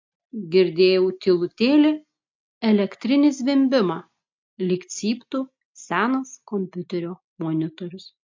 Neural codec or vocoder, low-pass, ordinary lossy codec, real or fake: none; 7.2 kHz; MP3, 48 kbps; real